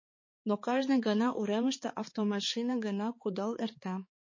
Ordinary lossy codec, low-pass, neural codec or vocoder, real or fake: MP3, 32 kbps; 7.2 kHz; codec, 16 kHz, 4 kbps, X-Codec, HuBERT features, trained on balanced general audio; fake